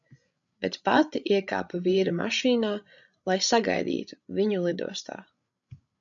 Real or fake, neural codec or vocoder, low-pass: fake; codec, 16 kHz, 16 kbps, FreqCodec, larger model; 7.2 kHz